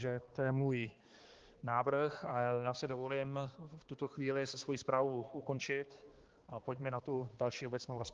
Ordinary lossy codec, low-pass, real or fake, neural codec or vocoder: Opus, 16 kbps; 7.2 kHz; fake; codec, 16 kHz, 2 kbps, X-Codec, HuBERT features, trained on balanced general audio